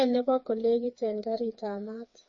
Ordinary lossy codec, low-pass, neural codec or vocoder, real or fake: MP3, 32 kbps; 7.2 kHz; codec, 16 kHz, 4 kbps, FreqCodec, smaller model; fake